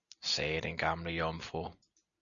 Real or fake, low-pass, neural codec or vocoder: real; 7.2 kHz; none